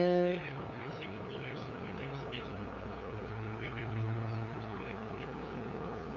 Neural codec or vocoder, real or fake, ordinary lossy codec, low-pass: codec, 16 kHz, 2 kbps, FunCodec, trained on LibriTTS, 25 frames a second; fake; none; 7.2 kHz